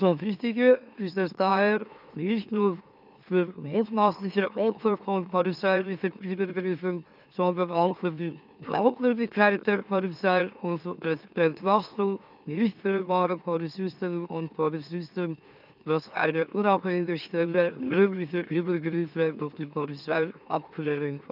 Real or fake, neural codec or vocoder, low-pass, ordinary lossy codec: fake; autoencoder, 44.1 kHz, a latent of 192 numbers a frame, MeloTTS; 5.4 kHz; none